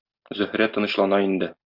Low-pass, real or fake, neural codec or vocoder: 5.4 kHz; real; none